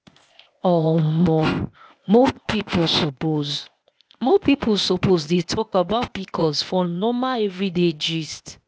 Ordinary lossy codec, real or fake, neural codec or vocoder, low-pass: none; fake; codec, 16 kHz, 0.8 kbps, ZipCodec; none